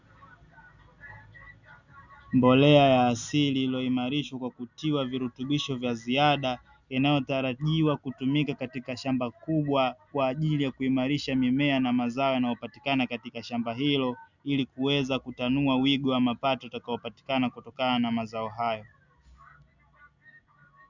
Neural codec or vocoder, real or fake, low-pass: none; real; 7.2 kHz